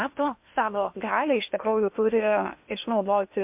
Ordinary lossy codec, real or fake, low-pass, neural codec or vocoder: MP3, 32 kbps; fake; 3.6 kHz; codec, 16 kHz in and 24 kHz out, 0.8 kbps, FocalCodec, streaming, 65536 codes